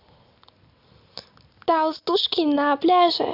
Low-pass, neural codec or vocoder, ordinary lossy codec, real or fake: 5.4 kHz; none; none; real